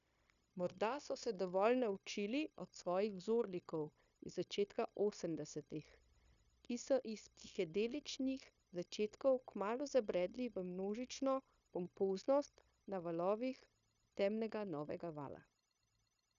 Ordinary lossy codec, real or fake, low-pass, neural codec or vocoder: none; fake; 7.2 kHz; codec, 16 kHz, 0.9 kbps, LongCat-Audio-Codec